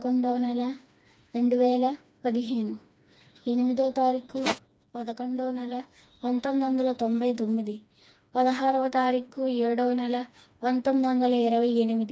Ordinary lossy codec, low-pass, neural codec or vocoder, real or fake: none; none; codec, 16 kHz, 2 kbps, FreqCodec, smaller model; fake